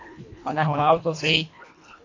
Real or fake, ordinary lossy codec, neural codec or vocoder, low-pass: fake; AAC, 48 kbps; codec, 24 kHz, 1.5 kbps, HILCodec; 7.2 kHz